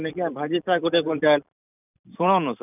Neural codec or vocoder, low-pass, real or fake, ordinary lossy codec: vocoder, 44.1 kHz, 128 mel bands every 256 samples, BigVGAN v2; 3.6 kHz; fake; none